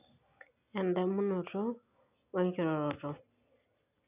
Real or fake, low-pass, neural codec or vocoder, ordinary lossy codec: real; 3.6 kHz; none; none